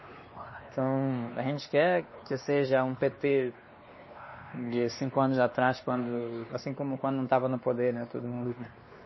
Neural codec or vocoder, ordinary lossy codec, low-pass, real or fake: codec, 16 kHz, 2 kbps, X-Codec, WavLM features, trained on Multilingual LibriSpeech; MP3, 24 kbps; 7.2 kHz; fake